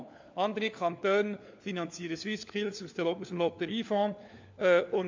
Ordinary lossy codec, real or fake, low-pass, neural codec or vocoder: MP3, 48 kbps; fake; 7.2 kHz; codec, 16 kHz, 4 kbps, FunCodec, trained on LibriTTS, 50 frames a second